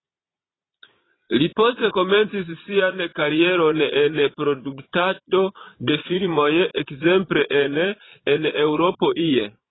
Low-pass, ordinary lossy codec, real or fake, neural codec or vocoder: 7.2 kHz; AAC, 16 kbps; fake; vocoder, 22.05 kHz, 80 mel bands, Vocos